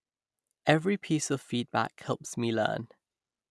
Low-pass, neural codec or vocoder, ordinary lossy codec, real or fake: none; none; none; real